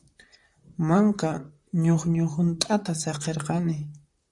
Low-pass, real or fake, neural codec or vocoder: 10.8 kHz; fake; vocoder, 44.1 kHz, 128 mel bands, Pupu-Vocoder